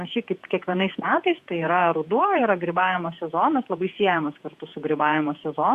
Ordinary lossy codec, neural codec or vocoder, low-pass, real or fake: Opus, 64 kbps; vocoder, 48 kHz, 128 mel bands, Vocos; 14.4 kHz; fake